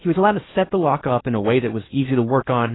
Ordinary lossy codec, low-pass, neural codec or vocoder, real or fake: AAC, 16 kbps; 7.2 kHz; codec, 16 kHz in and 24 kHz out, 0.8 kbps, FocalCodec, streaming, 65536 codes; fake